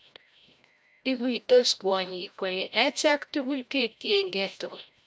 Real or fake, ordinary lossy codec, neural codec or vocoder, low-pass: fake; none; codec, 16 kHz, 0.5 kbps, FreqCodec, larger model; none